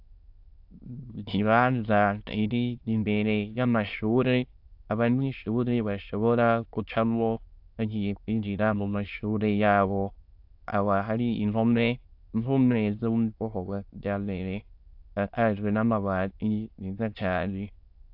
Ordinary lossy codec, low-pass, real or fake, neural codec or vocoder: AAC, 48 kbps; 5.4 kHz; fake; autoencoder, 22.05 kHz, a latent of 192 numbers a frame, VITS, trained on many speakers